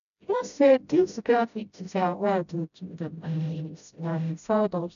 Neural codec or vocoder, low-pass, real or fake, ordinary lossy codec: codec, 16 kHz, 0.5 kbps, FreqCodec, smaller model; 7.2 kHz; fake; none